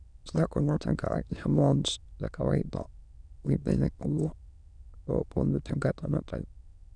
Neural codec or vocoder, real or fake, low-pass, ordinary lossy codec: autoencoder, 22.05 kHz, a latent of 192 numbers a frame, VITS, trained on many speakers; fake; 9.9 kHz; none